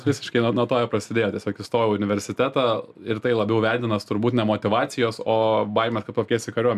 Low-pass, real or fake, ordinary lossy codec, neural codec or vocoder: 14.4 kHz; real; MP3, 96 kbps; none